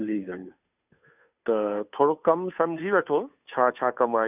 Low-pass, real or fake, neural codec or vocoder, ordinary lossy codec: 3.6 kHz; fake; codec, 16 kHz, 2 kbps, FunCodec, trained on Chinese and English, 25 frames a second; none